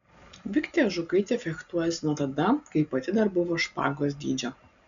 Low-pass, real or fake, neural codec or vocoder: 7.2 kHz; real; none